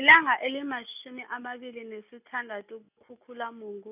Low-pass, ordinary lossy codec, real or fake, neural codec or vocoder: 3.6 kHz; none; real; none